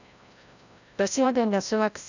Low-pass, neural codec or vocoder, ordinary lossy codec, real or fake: 7.2 kHz; codec, 16 kHz, 0.5 kbps, FreqCodec, larger model; none; fake